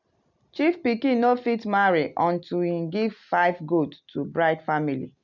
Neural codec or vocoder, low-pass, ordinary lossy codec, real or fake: none; 7.2 kHz; none; real